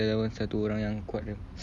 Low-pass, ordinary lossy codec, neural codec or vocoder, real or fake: none; none; none; real